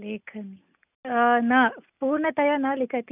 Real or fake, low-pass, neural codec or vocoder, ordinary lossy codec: real; 3.6 kHz; none; none